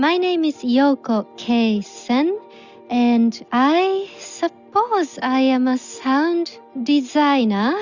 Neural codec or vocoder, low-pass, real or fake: none; 7.2 kHz; real